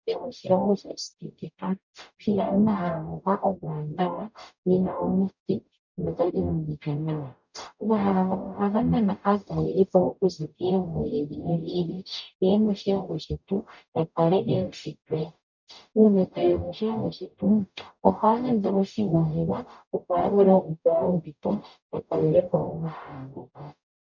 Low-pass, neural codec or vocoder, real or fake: 7.2 kHz; codec, 44.1 kHz, 0.9 kbps, DAC; fake